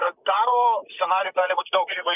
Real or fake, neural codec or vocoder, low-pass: fake; vocoder, 44.1 kHz, 128 mel bands, Pupu-Vocoder; 3.6 kHz